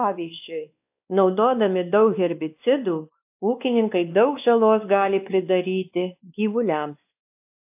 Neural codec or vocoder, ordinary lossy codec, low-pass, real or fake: codec, 16 kHz, 2 kbps, X-Codec, WavLM features, trained on Multilingual LibriSpeech; AAC, 32 kbps; 3.6 kHz; fake